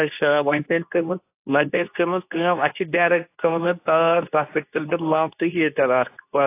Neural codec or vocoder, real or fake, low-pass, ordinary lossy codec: codec, 24 kHz, 0.9 kbps, WavTokenizer, medium speech release version 2; fake; 3.6 kHz; AAC, 24 kbps